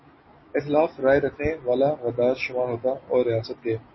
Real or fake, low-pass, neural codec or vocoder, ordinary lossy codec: real; 7.2 kHz; none; MP3, 24 kbps